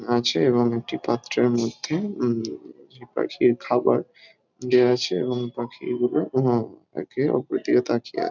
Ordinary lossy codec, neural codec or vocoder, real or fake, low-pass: none; none; real; 7.2 kHz